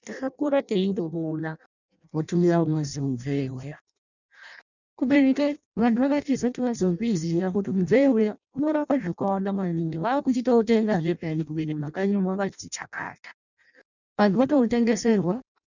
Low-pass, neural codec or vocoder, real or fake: 7.2 kHz; codec, 16 kHz in and 24 kHz out, 0.6 kbps, FireRedTTS-2 codec; fake